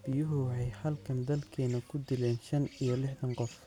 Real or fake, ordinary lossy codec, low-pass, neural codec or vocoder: real; none; 19.8 kHz; none